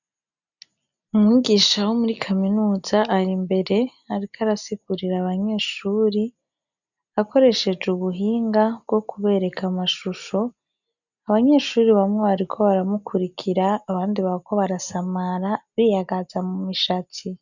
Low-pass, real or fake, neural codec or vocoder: 7.2 kHz; real; none